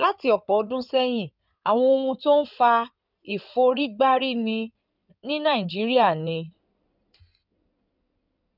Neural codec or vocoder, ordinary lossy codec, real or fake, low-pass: codec, 16 kHz in and 24 kHz out, 2.2 kbps, FireRedTTS-2 codec; none; fake; 5.4 kHz